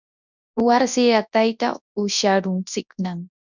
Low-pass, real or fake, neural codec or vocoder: 7.2 kHz; fake; codec, 24 kHz, 0.9 kbps, WavTokenizer, large speech release